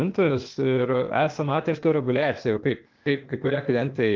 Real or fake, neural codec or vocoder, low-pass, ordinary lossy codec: fake; codec, 16 kHz, 0.8 kbps, ZipCodec; 7.2 kHz; Opus, 24 kbps